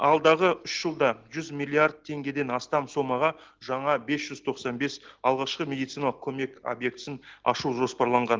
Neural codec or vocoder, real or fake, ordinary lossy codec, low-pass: none; real; Opus, 16 kbps; 7.2 kHz